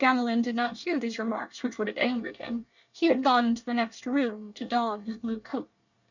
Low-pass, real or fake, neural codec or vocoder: 7.2 kHz; fake; codec, 24 kHz, 1 kbps, SNAC